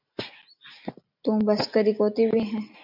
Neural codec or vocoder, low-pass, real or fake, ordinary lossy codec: none; 5.4 kHz; real; MP3, 48 kbps